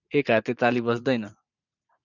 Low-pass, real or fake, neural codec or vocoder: 7.2 kHz; fake; vocoder, 44.1 kHz, 80 mel bands, Vocos